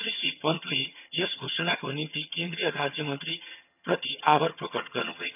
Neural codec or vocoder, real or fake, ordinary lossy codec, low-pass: vocoder, 22.05 kHz, 80 mel bands, HiFi-GAN; fake; none; 3.6 kHz